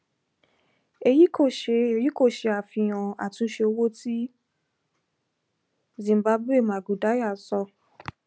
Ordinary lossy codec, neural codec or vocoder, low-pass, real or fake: none; none; none; real